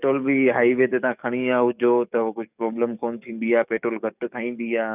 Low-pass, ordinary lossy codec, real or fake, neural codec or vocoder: 3.6 kHz; none; fake; codec, 44.1 kHz, 7.8 kbps, DAC